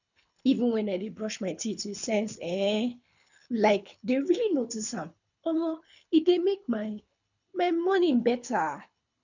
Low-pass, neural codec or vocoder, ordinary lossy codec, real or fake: 7.2 kHz; codec, 24 kHz, 6 kbps, HILCodec; none; fake